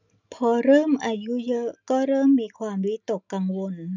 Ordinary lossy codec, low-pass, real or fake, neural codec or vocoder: none; 7.2 kHz; real; none